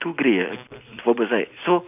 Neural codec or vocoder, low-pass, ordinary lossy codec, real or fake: none; 3.6 kHz; none; real